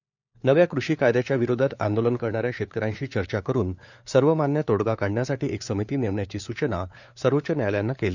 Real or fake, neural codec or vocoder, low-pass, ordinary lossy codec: fake; codec, 16 kHz, 4 kbps, FunCodec, trained on LibriTTS, 50 frames a second; 7.2 kHz; none